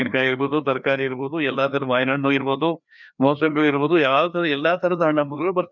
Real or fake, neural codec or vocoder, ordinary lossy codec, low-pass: fake; codec, 16 kHz, 2 kbps, FreqCodec, larger model; none; 7.2 kHz